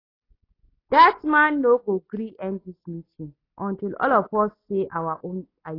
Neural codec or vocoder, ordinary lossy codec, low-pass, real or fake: none; AAC, 24 kbps; 5.4 kHz; real